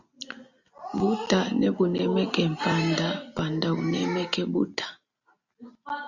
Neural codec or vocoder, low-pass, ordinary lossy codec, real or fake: none; 7.2 kHz; Opus, 64 kbps; real